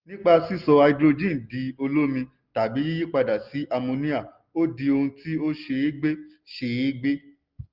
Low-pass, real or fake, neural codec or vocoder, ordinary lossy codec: 5.4 kHz; real; none; Opus, 24 kbps